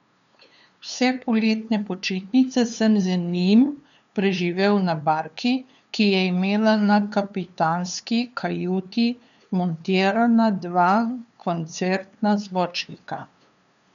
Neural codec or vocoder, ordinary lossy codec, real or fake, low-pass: codec, 16 kHz, 2 kbps, FunCodec, trained on LibriTTS, 25 frames a second; none; fake; 7.2 kHz